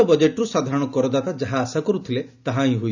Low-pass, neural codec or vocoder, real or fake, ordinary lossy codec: 7.2 kHz; none; real; none